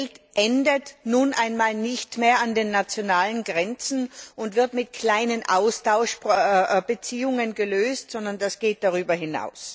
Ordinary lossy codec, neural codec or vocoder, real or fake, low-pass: none; none; real; none